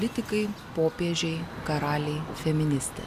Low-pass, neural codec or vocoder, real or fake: 14.4 kHz; none; real